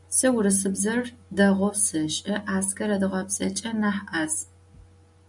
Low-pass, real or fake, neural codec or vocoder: 10.8 kHz; real; none